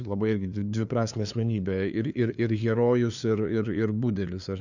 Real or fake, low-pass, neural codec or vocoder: fake; 7.2 kHz; codec, 16 kHz, 2 kbps, FunCodec, trained on LibriTTS, 25 frames a second